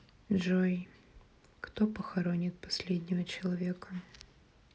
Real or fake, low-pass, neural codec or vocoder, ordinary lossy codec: real; none; none; none